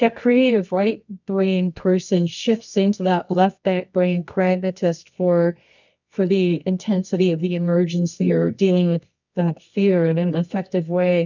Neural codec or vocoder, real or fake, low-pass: codec, 24 kHz, 0.9 kbps, WavTokenizer, medium music audio release; fake; 7.2 kHz